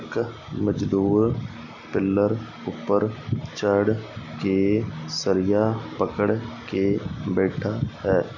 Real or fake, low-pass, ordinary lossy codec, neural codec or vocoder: real; 7.2 kHz; none; none